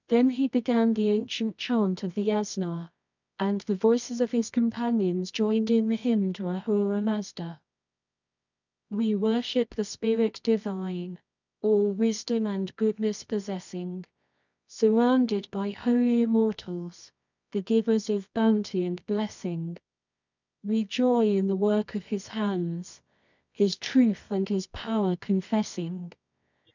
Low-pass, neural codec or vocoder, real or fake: 7.2 kHz; codec, 24 kHz, 0.9 kbps, WavTokenizer, medium music audio release; fake